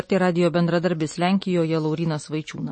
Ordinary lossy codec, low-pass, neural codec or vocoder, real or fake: MP3, 32 kbps; 9.9 kHz; none; real